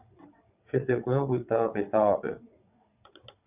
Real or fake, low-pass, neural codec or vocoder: fake; 3.6 kHz; vocoder, 22.05 kHz, 80 mel bands, WaveNeXt